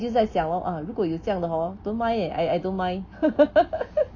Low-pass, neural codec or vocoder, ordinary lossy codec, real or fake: 7.2 kHz; none; none; real